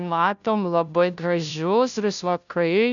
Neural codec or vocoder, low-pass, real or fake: codec, 16 kHz, 0.5 kbps, FunCodec, trained on Chinese and English, 25 frames a second; 7.2 kHz; fake